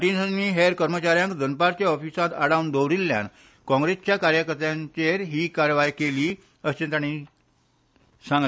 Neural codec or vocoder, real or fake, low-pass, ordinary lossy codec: none; real; none; none